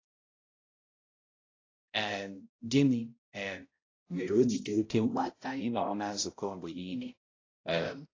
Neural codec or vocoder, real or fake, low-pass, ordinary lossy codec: codec, 16 kHz, 0.5 kbps, X-Codec, HuBERT features, trained on balanced general audio; fake; 7.2 kHz; AAC, 32 kbps